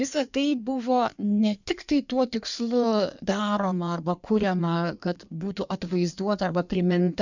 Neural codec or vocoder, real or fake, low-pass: codec, 16 kHz in and 24 kHz out, 1.1 kbps, FireRedTTS-2 codec; fake; 7.2 kHz